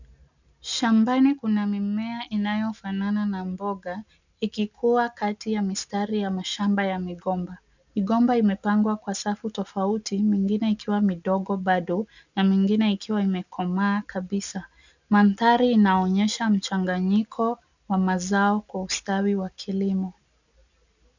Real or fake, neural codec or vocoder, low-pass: real; none; 7.2 kHz